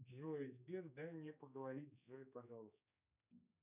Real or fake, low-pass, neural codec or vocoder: fake; 3.6 kHz; codec, 16 kHz, 2 kbps, X-Codec, HuBERT features, trained on general audio